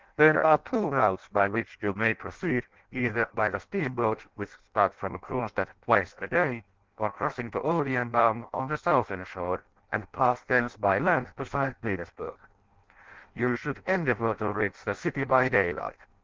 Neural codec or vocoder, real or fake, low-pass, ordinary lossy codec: codec, 16 kHz in and 24 kHz out, 0.6 kbps, FireRedTTS-2 codec; fake; 7.2 kHz; Opus, 16 kbps